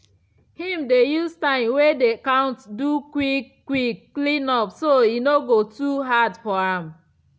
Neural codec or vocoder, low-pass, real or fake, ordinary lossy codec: none; none; real; none